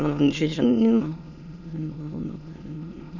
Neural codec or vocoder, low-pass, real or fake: autoencoder, 22.05 kHz, a latent of 192 numbers a frame, VITS, trained on many speakers; 7.2 kHz; fake